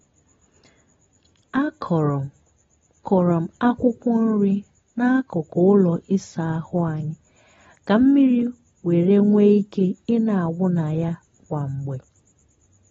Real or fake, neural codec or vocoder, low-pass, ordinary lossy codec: real; none; 7.2 kHz; AAC, 24 kbps